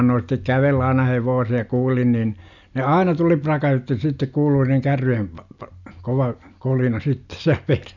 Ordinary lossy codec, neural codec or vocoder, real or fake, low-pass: none; none; real; 7.2 kHz